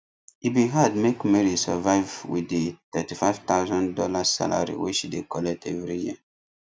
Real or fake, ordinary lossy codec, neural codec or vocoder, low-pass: real; none; none; none